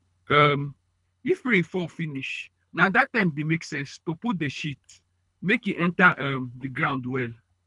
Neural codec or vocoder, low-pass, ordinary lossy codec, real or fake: codec, 24 kHz, 3 kbps, HILCodec; 10.8 kHz; none; fake